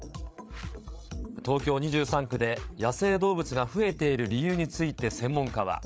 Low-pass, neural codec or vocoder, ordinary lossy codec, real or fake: none; codec, 16 kHz, 8 kbps, FreqCodec, larger model; none; fake